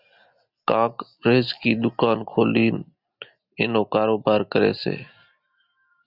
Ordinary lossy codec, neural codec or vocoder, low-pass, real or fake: Opus, 64 kbps; none; 5.4 kHz; real